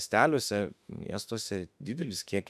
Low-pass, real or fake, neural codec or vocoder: 14.4 kHz; fake; autoencoder, 48 kHz, 32 numbers a frame, DAC-VAE, trained on Japanese speech